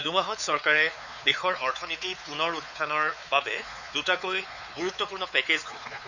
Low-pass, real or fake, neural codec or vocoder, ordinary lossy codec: 7.2 kHz; fake; codec, 16 kHz, 4 kbps, X-Codec, WavLM features, trained on Multilingual LibriSpeech; none